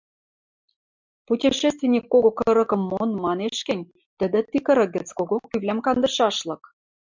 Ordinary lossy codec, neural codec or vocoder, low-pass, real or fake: MP3, 64 kbps; none; 7.2 kHz; real